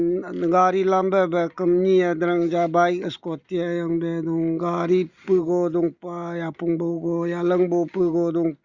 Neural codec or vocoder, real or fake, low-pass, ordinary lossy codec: none; real; 7.2 kHz; Opus, 64 kbps